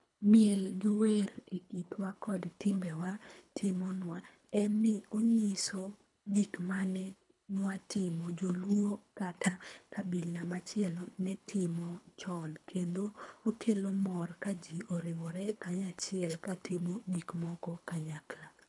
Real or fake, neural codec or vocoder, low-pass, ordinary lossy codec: fake; codec, 24 kHz, 3 kbps, HILCodec; 10.8 kHz; MP3, 96 kbps